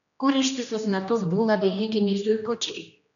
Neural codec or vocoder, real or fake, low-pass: codec, 16 kHz, 1 kbps, X-Codec, HuBERT features, trained on general audio; fake; 7.2 kHz